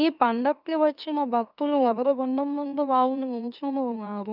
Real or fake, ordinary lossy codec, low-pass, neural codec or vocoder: fake; none; 5.4 kHz; autoencoder, 44.1 kHz, a latent of 192 numbers a frame, MeloTTS